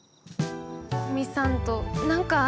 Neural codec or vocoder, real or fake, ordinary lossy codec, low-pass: none; real; none; none